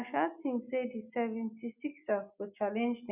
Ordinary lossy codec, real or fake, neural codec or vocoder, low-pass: none; real; none; 3.6 kHz